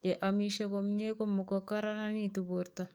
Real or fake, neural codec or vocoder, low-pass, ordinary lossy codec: fake; codec, 44.1 kHz, 7.8 kbps, DAC; none; none